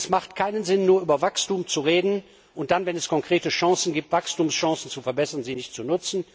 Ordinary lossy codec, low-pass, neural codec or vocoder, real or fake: none; none; none; real